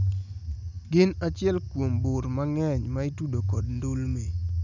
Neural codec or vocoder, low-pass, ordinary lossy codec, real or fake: none; 7.2 kHz; none; real